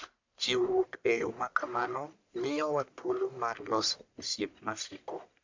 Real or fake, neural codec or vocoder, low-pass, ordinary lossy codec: fake; codec, 44.1 kHz, 1.7 kbps, Pupu-Codec; 7.2 kHz; MP3, 64 kbps